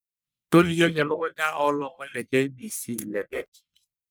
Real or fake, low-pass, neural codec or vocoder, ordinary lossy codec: fake; none; codec, 44.1 kHz, 1.7 kbps, Pupu-Codec; none